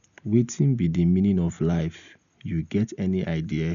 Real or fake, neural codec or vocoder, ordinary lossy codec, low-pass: real; none; none; 7.2 kHz